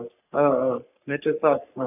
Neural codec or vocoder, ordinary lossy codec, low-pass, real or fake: codec, 44.1 kHz, 3.4 kbps, Pupu-Codec; none; 3.6 kHz; fake